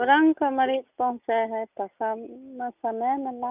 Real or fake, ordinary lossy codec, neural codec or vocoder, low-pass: real; none; none; 3.6 kHz